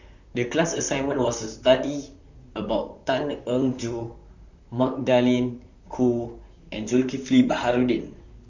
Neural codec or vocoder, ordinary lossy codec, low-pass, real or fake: vocoder, 44.1 kHz, 128 mel bands, Pupu-Vocoder; none; 7.2 kHz; fake